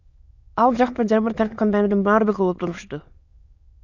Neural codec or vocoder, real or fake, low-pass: autoencoder, 22.05 kHz, a latent of 192 numbers a frame, VITS, trained on many speakers; fake; 7.2 kHz